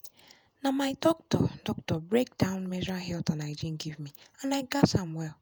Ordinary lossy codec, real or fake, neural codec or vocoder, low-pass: none; fake; vocoder, 48 kHz, 128 mel bands, Vocos; none